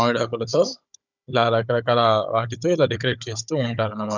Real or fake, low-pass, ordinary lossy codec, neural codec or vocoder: fake; 7.2 kHz; none; codec, 16 kHz, 16 kbps, FunCodec, trained on Chinese and English, 50 frames a second